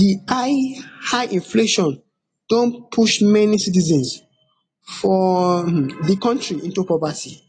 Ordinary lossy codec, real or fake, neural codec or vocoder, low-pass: AAC, 32 kbps; real; none; 9.9 kHz